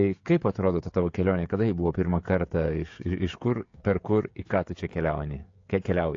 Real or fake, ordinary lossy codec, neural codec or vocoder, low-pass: fake; AAC, 48 kbps; codec, 16 kHz, 16 kbps, FreqCodec, smaller model; 7.2 kHz